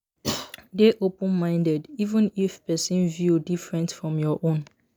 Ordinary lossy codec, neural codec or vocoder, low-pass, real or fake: none; none; none; real